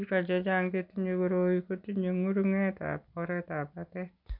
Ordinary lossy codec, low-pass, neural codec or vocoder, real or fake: none; 5.4 kHz; none; real